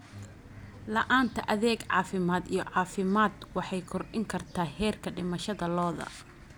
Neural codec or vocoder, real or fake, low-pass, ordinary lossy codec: none; real; none; none